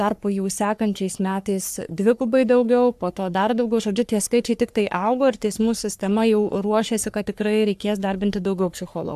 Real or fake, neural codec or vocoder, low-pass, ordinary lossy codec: fake; codec, 44.1 kHz, 3.4 kbps, Pupu-Codec; 14.4 kHz; AAC, 96 kbps